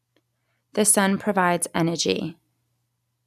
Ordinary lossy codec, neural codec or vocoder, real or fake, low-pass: none; none; real; 14.4 kHz